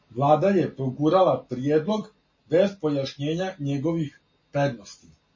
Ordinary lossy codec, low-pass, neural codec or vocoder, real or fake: MP3, 32 kbps; 7.2 kHz; none; real